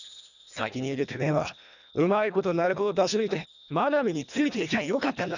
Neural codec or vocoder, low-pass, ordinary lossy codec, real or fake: codec, 24 kHz, 1.5 kbps, HILCodec; 7.2 kHz; none; fake